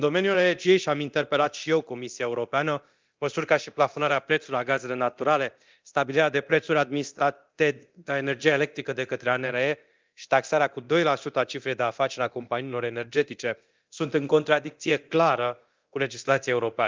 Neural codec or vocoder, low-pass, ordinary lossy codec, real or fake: codec, 24 kHz, 0.9 kbps, DualCodec; 7.2 kHz; Opus, 24 kbps; fake